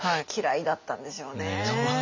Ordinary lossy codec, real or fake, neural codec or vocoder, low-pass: MP3, 64 kbps; real; none; 7.2 kHz